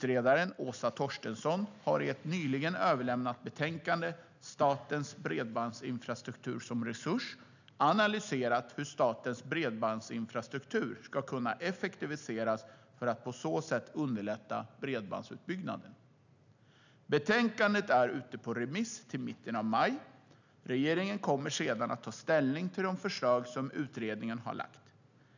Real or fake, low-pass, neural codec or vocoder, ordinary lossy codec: real; 7.2 kHz; none; none